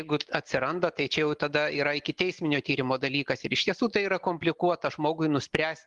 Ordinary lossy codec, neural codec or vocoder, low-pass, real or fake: Opus, 24 kbps; none; 10.8 kHz; real